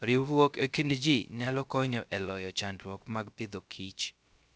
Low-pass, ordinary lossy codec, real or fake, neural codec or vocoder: none; none; fake; codec, 16 kHz, 0.3 kbps, FocalCodec